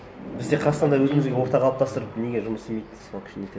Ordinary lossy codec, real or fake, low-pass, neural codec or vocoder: none; real; none; none